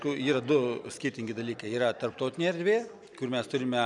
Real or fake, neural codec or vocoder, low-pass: real; none; 10.8 kHz